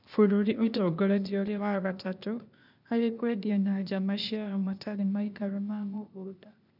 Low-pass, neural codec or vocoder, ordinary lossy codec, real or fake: 5.4 kHz; codec, 16 kHz, 0.8 kbps, ZipCodec; none; fake